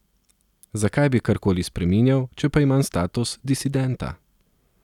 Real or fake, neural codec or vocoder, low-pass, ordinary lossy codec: real; none; 19.8 kHz; none